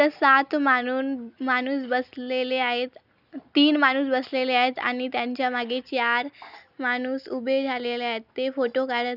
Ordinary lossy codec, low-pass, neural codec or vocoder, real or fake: none; 5.4 kHz; none; real